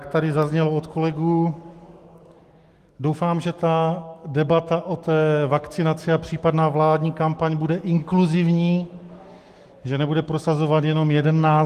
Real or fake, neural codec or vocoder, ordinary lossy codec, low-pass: fake; autoencoder, 48 kHz, 128 numbers a frame, DAC-VAE, trained on Japanese speech; Opus, 24 kbps; 14.4 kHz